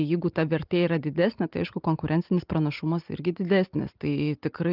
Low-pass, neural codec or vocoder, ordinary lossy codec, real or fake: 5.4 kHz; none; Opus, 24 kbps; real